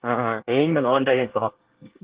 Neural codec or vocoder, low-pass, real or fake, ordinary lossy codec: codec, 24 kHz, 1 kbps, SNAC; 3.6 kHz; fake; Opus, 16 kbps